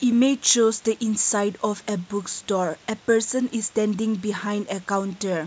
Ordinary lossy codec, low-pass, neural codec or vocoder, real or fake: none; none; none; real